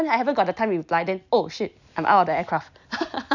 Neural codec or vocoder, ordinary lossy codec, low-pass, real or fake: none; none; 7.2 kHz; real